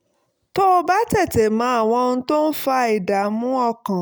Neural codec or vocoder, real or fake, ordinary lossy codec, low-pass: none; real; none; none